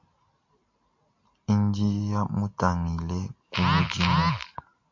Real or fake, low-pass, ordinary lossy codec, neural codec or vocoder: real; 7.2 kHz; MP3, 64 kbps; none